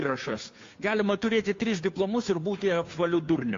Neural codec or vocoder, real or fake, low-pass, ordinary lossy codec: codec, 16 kHz, 2 kbps, FunCodec, trained on Chinese and English, 25 frames a second; fake; 7.2 kHz; AAC, 48 kbps